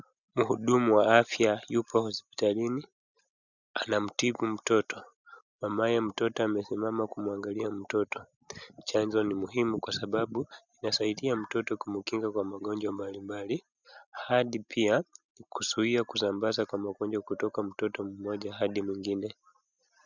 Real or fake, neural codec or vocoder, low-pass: real; none; 7.2 kHz